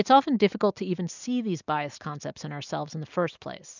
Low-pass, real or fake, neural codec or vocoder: 7.2 kHz; real; none